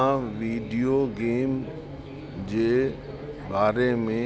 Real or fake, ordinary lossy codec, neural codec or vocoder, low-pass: real; none; none; none